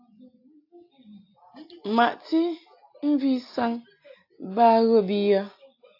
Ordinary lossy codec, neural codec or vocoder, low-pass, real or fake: AAC, 32 kbps; none; 5.4 kHz; real